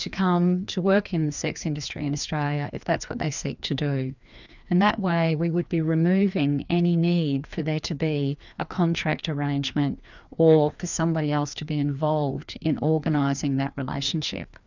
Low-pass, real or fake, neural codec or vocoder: 7.2 kHz; fake; codec, 16 kHz, 2 kbps, FreqCodec, larger model